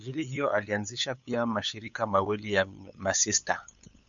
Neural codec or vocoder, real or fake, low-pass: codec, 16 kHz, 8 kbps, FunCodec, trained on LibriTTS, 25 frames a second; fake; 7.2 kHz